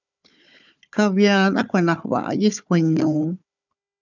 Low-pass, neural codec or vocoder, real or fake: 7.2 kHz; codec, 16 kHz, 4 kbps, FunCodec, trained on Chinese and English, 50 frames a second; fake